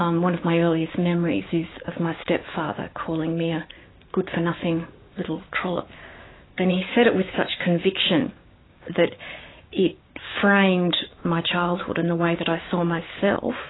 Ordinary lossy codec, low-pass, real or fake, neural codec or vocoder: AAC, 16 kbps; 7.2 kHz; fake; codec, 16 kHz, 6 kbps, DAC